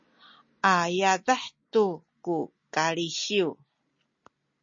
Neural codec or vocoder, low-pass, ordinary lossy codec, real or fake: none; 7.2 kHz; MP3, 32 kbps; real